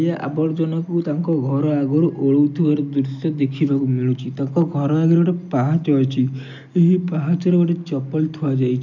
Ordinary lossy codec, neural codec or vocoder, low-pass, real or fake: none; none; 7.2 kHz; real